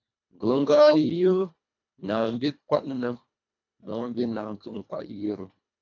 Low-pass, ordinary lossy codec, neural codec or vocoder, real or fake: 7.2 kHz; MP3, 64 kbps; codec, 24 kHz, 1.5 kbps, HILCodec; fake